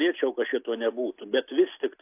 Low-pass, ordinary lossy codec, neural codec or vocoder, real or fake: 3.6 kHz; AAC, 24 kbps; none; real